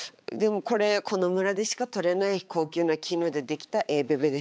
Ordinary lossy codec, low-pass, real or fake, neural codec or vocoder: none; none; fake; codec, 16 kHz, 4 kbps, X-Codec, HuBERT features, trained on balanced general audio